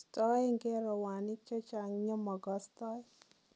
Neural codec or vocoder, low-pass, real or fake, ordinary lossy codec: none; none; real; none